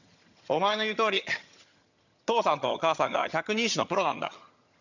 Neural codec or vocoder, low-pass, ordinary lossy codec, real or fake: vocoder, 22.05 kHz, 80 mel bands, HiFi-GAN; 7.2 kHz; none; fake